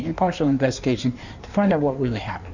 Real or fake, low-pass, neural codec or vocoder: fake; 7.2 kHz; codec, 16 kHz in and 24 kHz out, 1.1 kbps, FireRedTTS-2 codec